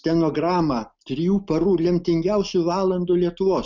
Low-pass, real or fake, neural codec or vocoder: 7.2 kHz; real; none